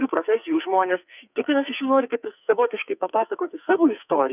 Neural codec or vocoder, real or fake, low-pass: codec, 44.1 kHz, 2.6 kbps, SNAC; fake; 3.6 kHz